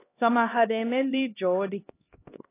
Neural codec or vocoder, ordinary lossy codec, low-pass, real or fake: codec, 16 kHz, 1 kbps, X-Codec, HuBERT features, trained on LibriSpeech; AAC, 16 kbps; 3.6 kHz; fake